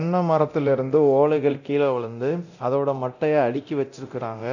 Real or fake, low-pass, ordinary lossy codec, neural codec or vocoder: fake; 7.2 kHz; none; codec, 24 kHz, 0.9 kbps, DualCodec